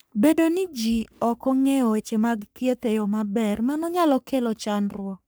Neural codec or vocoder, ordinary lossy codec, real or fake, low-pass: codec, 44.1 kHz, 3.4 kbps, Pupu-Codec; none; fake; none